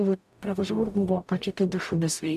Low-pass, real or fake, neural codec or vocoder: 14.4 kHz; fake; codec, 44.1 kHz, 0.9 kbps, DAC